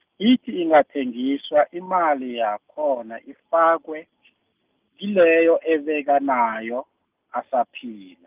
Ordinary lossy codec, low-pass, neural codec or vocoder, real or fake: Opus, 24 kbps; 3.6 kHz; none; real